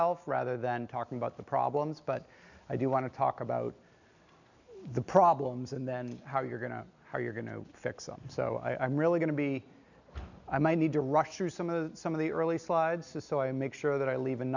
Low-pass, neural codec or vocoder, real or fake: 7.2 kHz; none; real